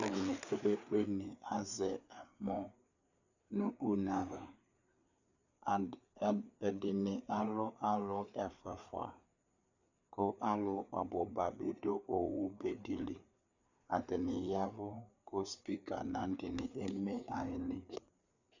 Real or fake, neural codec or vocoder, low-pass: fake; codec, 16 kHz, 4 kbps, FreqCodec, larger model; 7.2 kHz